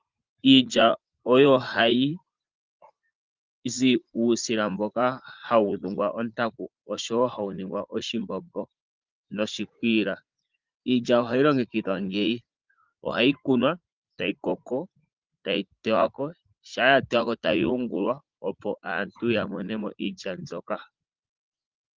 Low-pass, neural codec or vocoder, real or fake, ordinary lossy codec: 7.2 kHz; vocoder, 44.1 kHz, 80 mel bands, Vocos; fake; Opus, 32 kbps